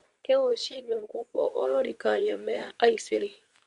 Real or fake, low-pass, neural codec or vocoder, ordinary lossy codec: fake; 10.8 kHz; codec, 24 kHz, 0.9 kbps, WavTokenizer, medium speech release version 2; none